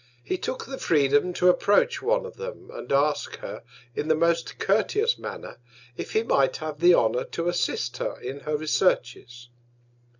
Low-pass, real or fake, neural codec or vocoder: 7.2 kHz; real; none